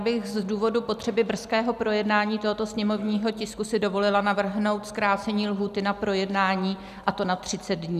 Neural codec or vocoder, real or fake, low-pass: none; real; 14.4 kHz